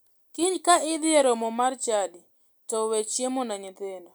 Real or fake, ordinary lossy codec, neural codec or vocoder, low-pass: real; none; none; none